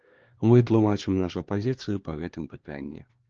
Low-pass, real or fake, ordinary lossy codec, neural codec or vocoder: 7.2 kHz; fake; Opus, 16 kbps; codec, 16 kHz, 2 kbps, X-Codec, HuBERT features, trained on LibriSpeech